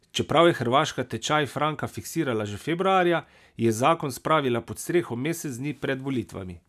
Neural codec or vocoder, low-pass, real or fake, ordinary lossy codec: none; 14.4 kHz; real; none